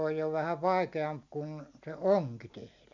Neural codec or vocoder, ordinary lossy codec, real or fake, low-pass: none; MP3, 64 kbps; real; 7.2 kHz